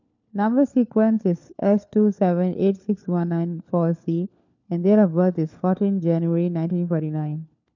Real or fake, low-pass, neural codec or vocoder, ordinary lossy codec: fake; 7.2 kHz; codec, 16 kHz, 4 kbps, FunCodec, trained on LibriTTS, 50 frames a second; none